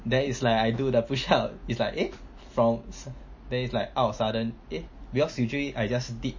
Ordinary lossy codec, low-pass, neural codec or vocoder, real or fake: MP3, 32 kbps; 7.2 kHz; none; real